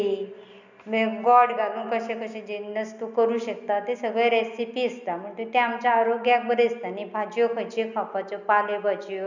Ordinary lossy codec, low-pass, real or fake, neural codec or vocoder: none; 7.2 kHz; real; none